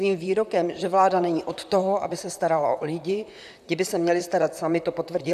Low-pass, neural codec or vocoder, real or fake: 14.4 kHz; vocoder, 44.1 kHz, 128 mel bands, Pupu-Vocoder; fake